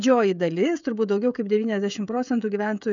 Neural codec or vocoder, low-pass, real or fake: codec, 16 kHz, 16 kbps, FreqCodec, smaller model; 7.2 kHz; fake